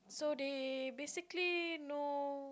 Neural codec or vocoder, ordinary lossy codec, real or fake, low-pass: none; none; real; none